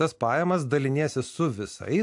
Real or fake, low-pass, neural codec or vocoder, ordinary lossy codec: real; 10.8 kHz; none; AAC, 64 kbps